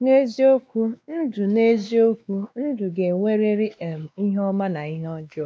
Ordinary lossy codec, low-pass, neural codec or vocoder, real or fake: none; none; codec, 16 kHz, 2 kbps, X-Codec, WavLM features, trained on Multilingual LibriSpeech; fake